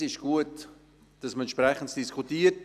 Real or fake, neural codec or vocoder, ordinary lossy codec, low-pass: real; none; none; 14.4 kHz